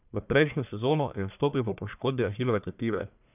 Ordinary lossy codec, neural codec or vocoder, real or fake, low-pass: none; codec, 44.1 kHz, 1.7 kbps, Pupu-Codec; fake; 3.6 kHz